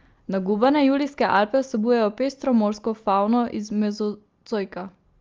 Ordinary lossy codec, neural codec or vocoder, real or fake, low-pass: Opus, 32 kbps; none; real; 7.2 kHz